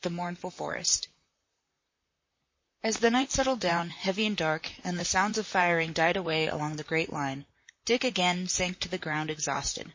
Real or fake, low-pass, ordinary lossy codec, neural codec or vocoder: fake; 7.2 kHz; MP3, 32 kbps; vocoder, 44.1 kHz, 128 mel bands, Pupu-Vocoder